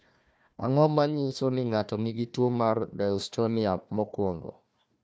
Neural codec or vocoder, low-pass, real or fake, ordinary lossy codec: codec, 16 kHz, 1 kbps, FunCodec, trained on Chinese and English, 50 frames a second; none; fake; none